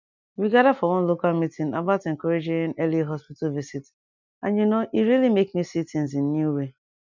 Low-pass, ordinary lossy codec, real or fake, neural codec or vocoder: 7.2 kHz; none; real; none